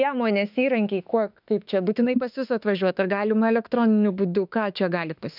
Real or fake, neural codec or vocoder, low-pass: fake; autoencoder, 48 kHz, 32 numbers a frame, DAC-VAE, trained on Japanese speech; 5.4 kHz